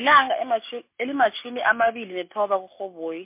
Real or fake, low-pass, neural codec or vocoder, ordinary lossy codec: real; 3.6 kHz; none; MP3, 24 kbps